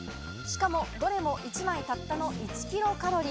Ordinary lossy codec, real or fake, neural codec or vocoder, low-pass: none; real; none; none